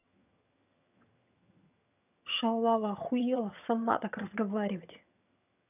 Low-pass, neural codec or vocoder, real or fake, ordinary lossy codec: 3.6 kHz; vocoder, 22.05 kHz, 80 mel bands, HiFi-GAN; fake; none